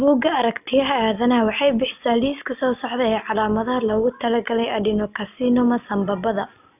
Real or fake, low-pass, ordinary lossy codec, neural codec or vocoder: real; 3.6 kHz; none; none